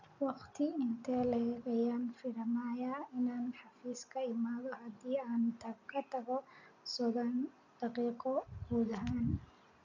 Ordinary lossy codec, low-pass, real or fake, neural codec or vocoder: none; 7.2 kHz; real; none